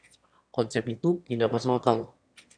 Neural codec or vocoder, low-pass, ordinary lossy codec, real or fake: autoencoder, 22.05 kHz, a latent of 192 numbers a frame, VITS, trained on one speaker; 9.9 kHz; MP3, 96 kbps; fake